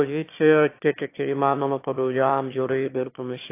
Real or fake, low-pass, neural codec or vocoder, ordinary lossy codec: fake; 3.6 kHz; autoencoder, 22.05 kHz, a latent of 192 numbers a frame, VITS, trained on one speaker; AAC, 24 kbps